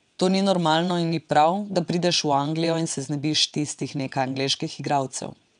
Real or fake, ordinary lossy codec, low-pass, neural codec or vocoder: fake; none; 9.9 kHz; vocoder, 22.05 kHz, 80 mel bands, WaveNeXt